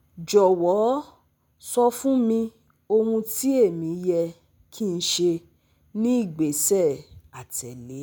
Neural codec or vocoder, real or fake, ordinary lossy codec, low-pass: none; real; none; none